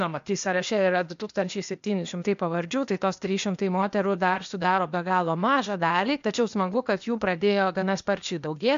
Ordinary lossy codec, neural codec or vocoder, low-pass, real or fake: MP3, 64 kbps; codec, 16 kHz, 0.8 kbps, ZipCodec; 7.2 kHz; fake